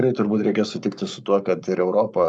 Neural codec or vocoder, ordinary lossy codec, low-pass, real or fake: codec, 44.1 kHz, 7.8 kbps, Pupu-Codec; MP3, 96 kbps; 10.8 kHz; fake